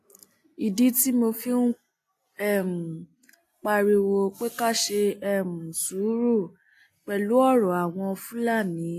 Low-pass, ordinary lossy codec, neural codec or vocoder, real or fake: 14.4 kHz; AAC, 64 kbps; none; real